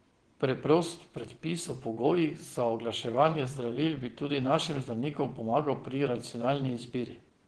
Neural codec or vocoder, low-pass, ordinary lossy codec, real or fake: vocoder, 22.05 kHz, 80 mel bands, WaveNeXt; 9.9 kHz; Opus, 16 kbps; fake